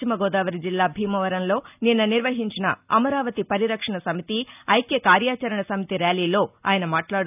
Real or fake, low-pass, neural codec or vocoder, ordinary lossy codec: real; 3.6 kHz; none; none